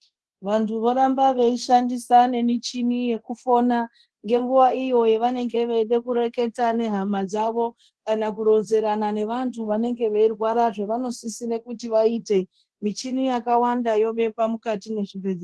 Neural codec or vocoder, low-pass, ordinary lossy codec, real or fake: codec, 24 kHz, 0.9 kbps, DualCodec; 10.8 kHz; Opus, 16 kbps; fake